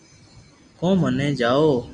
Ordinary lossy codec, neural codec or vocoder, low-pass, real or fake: Opus, 64 kbps; none; 9.9 kHz; real